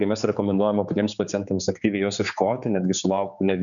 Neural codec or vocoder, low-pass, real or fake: codec, 16 kHz, 4 kbps, X-Codec, HuBERT features, trained on general audio; 7.2 kHz; fake